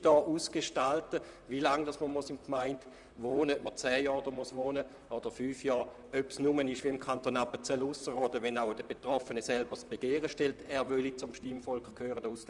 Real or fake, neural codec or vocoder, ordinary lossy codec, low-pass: fake; vocoder, 44.1 kHz, 128 mel bands, Pupu-Vocoder; none; 10.8 kHz